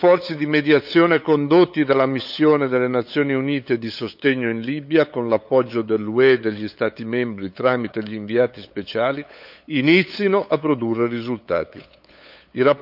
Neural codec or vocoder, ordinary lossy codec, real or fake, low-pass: codec, 16 kHz, 8 kbps, FunCodec, trained on LibriTTS, 25 frames a second; none; fake; 5.4 kHz